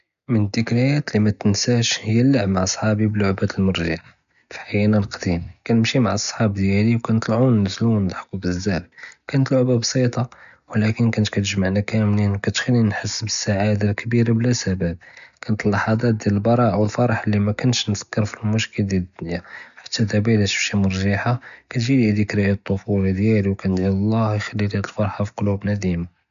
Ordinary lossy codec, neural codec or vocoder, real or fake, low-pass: none; none; real; 7.2 kHz